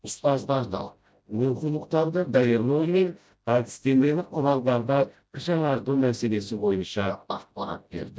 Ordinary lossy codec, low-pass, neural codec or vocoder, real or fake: none; none; codec, 16 kHz, 0.5 kbps, FreqCodec, smaller model; fake